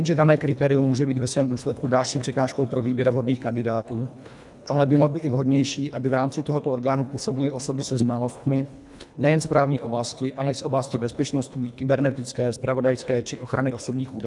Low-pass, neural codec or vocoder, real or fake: 10.8 kHz; codec, 24 kHz, 1.5 kbps, HILCodec; fake